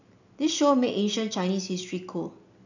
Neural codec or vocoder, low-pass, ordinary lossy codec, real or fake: none; 7.2 kHz; none; real